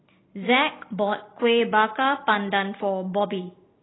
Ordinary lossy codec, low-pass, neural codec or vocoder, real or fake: AAC, 16 kbps; 7.2 kHz; none; real